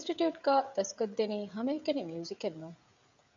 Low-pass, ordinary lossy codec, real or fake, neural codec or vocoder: 7.2 kHz; AAC, 64 kbps; fake; codec, 16 kHz, 16 kbps, FreqCodec, smaller model